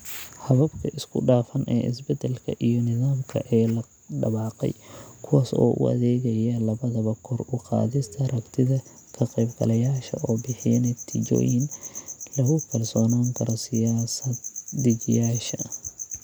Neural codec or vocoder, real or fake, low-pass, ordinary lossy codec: none; real; none; none